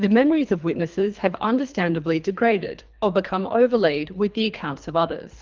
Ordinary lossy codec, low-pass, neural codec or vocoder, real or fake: Opus, 24 kbps; 7.2 kHz; codec, 24 kHz, 3 kbps, HILCodec; fake